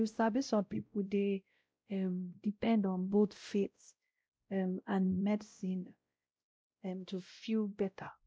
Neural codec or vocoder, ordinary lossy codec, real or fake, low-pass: codec, 16 kHz, 0.5 kbps, X-Codec, WavLM features, trained on Multilingual LibriSpeech; none; fake; none